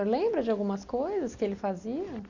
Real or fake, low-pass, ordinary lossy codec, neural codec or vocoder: real; 7.2 kHz; none; none